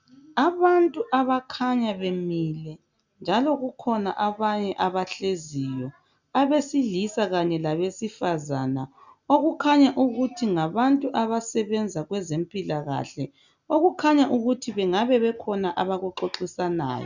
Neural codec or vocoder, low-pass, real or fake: none; 7.2 kHz; real